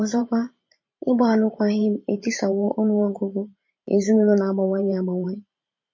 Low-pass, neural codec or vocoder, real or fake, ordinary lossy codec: 7.2 kHz; vocoder, 44.1 kHz, 128 mel bands every 512 samples, BigVGAN v2; fake; MP3, 32 kbps